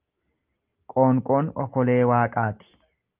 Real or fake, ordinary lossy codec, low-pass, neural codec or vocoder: real; Opus, 24 kbps; 3.6 kHz; none